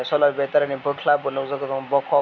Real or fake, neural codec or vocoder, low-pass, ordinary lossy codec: real; none; 7.2 kHz; none